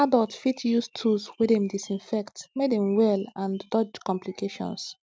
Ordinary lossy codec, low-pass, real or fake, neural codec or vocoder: none; none; real; none